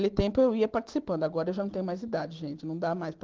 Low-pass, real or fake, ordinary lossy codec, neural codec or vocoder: 7.2 kHz; real; Opus, 16 kbps; none